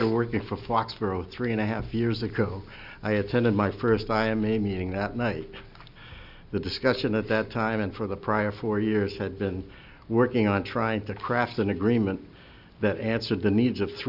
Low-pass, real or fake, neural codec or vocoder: 5.4 kHz; real; none